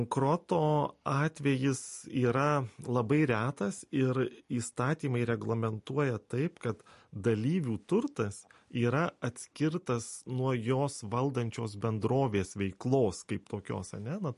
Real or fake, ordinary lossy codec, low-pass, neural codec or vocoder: real; MP3, 48 kbps; 14.4 kHz; none